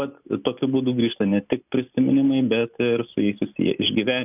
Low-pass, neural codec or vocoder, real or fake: 3.6 kHz; none; real